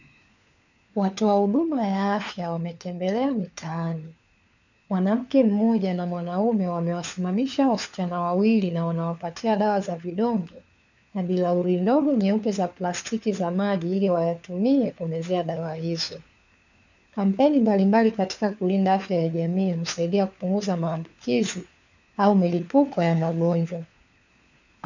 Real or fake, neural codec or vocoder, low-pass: fake; codec, 16 kHz, 4 kbps, FunCodec, trained on LibriTTS, 50 frames a second; 7.2 kHz